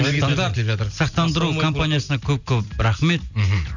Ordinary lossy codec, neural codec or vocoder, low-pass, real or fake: none; none; 7.2 kHz; real